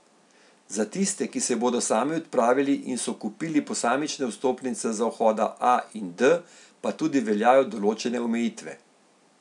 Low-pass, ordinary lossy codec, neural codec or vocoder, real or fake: 10.8 kHz; none; none; real